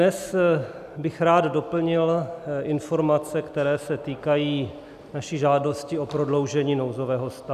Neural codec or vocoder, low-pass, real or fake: none; 14.4 kHz; real